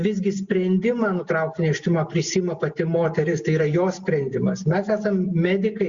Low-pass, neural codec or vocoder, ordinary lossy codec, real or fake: 7.2 kHz; none; Opus, 64 kbps; real